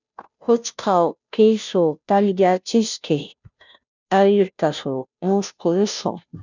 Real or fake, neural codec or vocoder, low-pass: fake; codec, 16 kHz, 0.5 kbps, FunCodec, trained on Chinese and English, 25 frames a second; 7.2 kHz